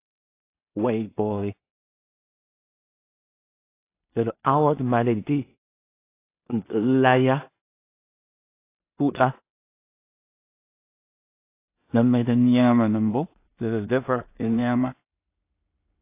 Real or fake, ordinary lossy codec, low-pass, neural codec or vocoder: fake; AAC, 24 kbps; 3.6 kHz; codec, 16 kHz in and 24 kHz out, 0.4 kbps, LongCat-Audio-Codec, two codebook decoder